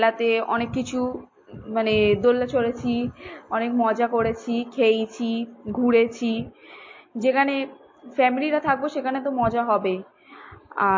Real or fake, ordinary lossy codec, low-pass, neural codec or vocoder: real; MP3, 32 kbps; 7.2 kHz; none